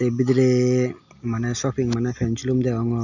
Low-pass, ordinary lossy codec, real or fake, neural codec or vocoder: 7.2 kHz; none; real; none